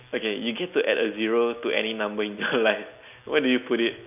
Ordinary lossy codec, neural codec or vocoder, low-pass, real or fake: none; none; 3.6 kHz; real